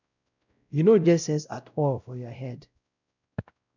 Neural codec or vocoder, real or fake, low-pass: codec, 16 kHz, 0.5 kbps, X-Codec, WavLM features, trained on Multilingual LibriSpeech; fake; 7.2 kHz